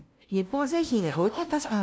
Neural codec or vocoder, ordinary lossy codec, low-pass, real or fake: codec, 16 kHz, 0.5 kbps, FunCodec, trained on LibriTTS, 25 frames a second; none; none; fake